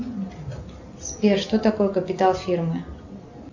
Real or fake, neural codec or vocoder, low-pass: real; none; 7.2 kHz